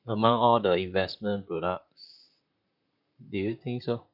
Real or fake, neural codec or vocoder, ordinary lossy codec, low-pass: fake; vocoder, 44.1 kHz, 128 mel bands, Pupu-Vocoder; none; 5.4 kHz